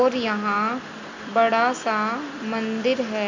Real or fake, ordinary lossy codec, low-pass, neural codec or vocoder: real; MP3, 48 kbps; 7.2 kHz; none